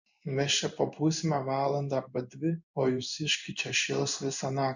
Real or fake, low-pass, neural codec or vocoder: fake; 7.2 kHz; codec, 16 kHz in and 24 kHz out, 1 kbps, XY-Tokenizer